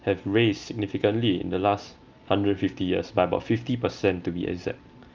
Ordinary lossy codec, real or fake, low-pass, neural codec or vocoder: Opus, 24 kbps; real; 7.2 kHz; none